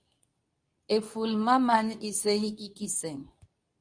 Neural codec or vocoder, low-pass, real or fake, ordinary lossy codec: codec, 24 kHz, 0.9 kbps, WavTokenizer, medium speech release version 2; 9.9 kHz; fake; Opus, 64 kbps